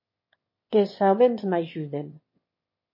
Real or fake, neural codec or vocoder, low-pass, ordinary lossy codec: fake; autoencoder, 22.05 kHz, a latent of 192 numbers a frame, VITS, trained on one speaker; 5.4 kHz; MP3, 24 kbps